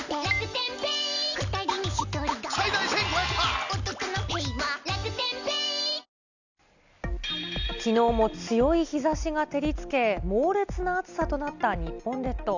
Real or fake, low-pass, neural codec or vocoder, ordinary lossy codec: real; 7.2 kHz; none; none